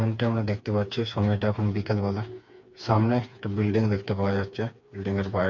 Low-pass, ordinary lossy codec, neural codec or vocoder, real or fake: 7.2 kHz; MP3, 48 kbps; codec, 16 kHz, 4 kbps, FreqCodec, smaller model; fake